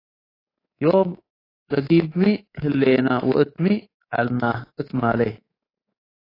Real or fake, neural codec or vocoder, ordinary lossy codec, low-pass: fake; codec, 24 kHz, 3.1 kbps, DualCodec; AAC, 24 kbps; 5.4 kHz